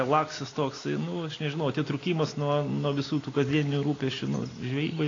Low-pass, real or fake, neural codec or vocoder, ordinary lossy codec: 7.2 kHz; real; none; AAC, 32 kbps